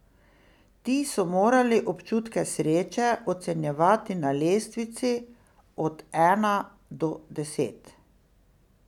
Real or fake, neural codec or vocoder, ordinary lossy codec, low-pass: real; none; none; 19.8 kHz